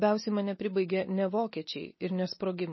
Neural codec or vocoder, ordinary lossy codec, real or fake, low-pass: none; MP3, 24 kbps; real; 7.2 kHz